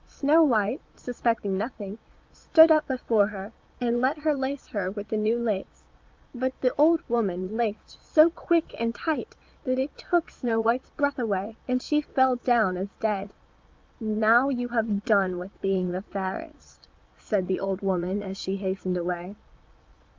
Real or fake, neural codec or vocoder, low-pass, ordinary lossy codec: fake; vocoder, 22.05 kHz, 80 mel bands, Vocos; 7.2 kHz; Opus, 32 kbps